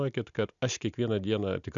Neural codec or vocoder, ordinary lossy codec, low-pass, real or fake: none; MP3, 96 kbps; 7.2 kHz; real